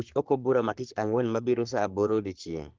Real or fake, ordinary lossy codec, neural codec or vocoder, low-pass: fake; Opus, 16 kbps; codec, 44.1 kHz, 3.4 kbps, Pupu-Codec; 7.2 kHz